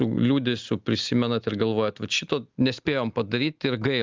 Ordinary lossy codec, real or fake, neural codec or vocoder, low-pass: Opus, 24 kbps; real; none; 7.2 kHz